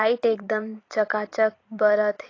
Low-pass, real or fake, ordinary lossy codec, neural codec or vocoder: 7.2 kHz; fake; AAC, 32 kbps; vocoder, 44.1 kHz, 128 mel bands every 512 samples, BigVGAN v2